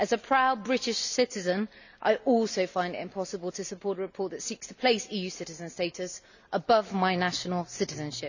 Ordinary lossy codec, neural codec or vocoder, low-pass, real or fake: none; none; 7.2 kHz; real